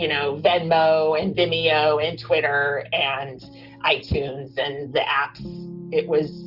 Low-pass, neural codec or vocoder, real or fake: 5.4 kHz; none; real